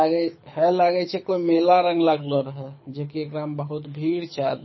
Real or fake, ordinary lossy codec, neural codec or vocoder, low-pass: fake; MP3, 24 kbps; codec, 24 kHz, 6 kbps, HILCodec; 7.2 kHz